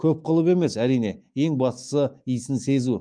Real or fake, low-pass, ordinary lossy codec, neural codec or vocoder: fake; 9.9 kHz; Opus, 32 kbps; autoencoder, 48 kHz, 128 numbers a frame, DAC-VAE, trained on Japanese speech